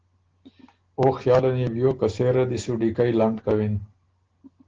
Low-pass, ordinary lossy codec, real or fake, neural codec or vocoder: 7.2 kHz; Opus, 16 kbps; real; none